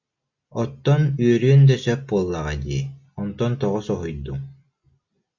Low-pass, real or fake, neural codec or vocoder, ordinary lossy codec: 7.2 kHz; real; none; Opus, 64 kbps